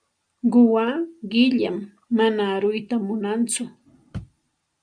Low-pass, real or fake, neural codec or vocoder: 9.9 kHz; real; none